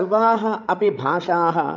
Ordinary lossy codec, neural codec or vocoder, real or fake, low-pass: MP3, 64 kbps; codec, 16 kHz, 16 kbps, FreqCodec, larger model; fake; 7.2 kHz